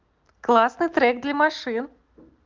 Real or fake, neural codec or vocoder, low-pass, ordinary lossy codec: real; none; 7.2 kHz; Opus, 32 kbps